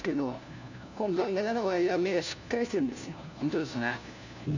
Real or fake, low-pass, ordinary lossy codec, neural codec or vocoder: fake; 7.2 kHz; none; codec, 16 kHz, 1 kbps, FunCodec, trained on LibriTTS, 50 frames a second